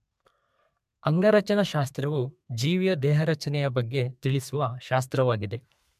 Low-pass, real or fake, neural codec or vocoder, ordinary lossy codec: 14.4 kHz; fake; codec, 32 kHz, 1.9 kbps, SNAC; MP3, 96 kbps